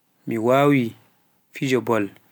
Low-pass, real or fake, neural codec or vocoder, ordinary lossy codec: none; real; none; none